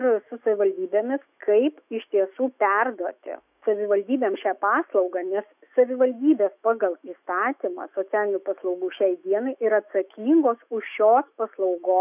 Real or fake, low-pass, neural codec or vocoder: fake; 3.6 kHz; autoencoder, 48 kHz, 128 numbers a frame, DAC-VAE, trained on Japanese speech